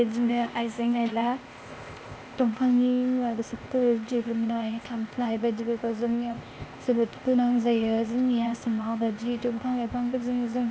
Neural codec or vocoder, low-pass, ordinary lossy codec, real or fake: codec, 16 kHz, 0.8 kbps, ZipCodec; none; none; fake